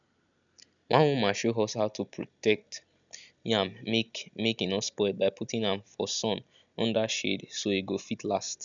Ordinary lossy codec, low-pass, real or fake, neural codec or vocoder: none; 7.2 kHz; real; none